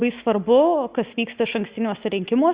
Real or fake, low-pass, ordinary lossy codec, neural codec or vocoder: real; 3.6 kHz; Opus, 64 kbps; none